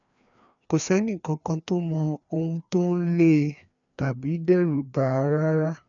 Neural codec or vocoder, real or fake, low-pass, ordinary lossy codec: codec, 16 kHz, 2 kbps, FreqCodec, larger model; fake; 7.2 kHz; none